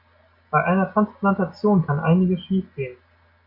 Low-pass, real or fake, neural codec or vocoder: 5.4 kHz; real; none